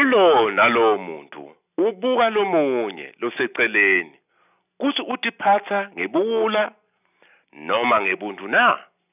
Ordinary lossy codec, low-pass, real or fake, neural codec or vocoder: none; 3.6 kHz; real; none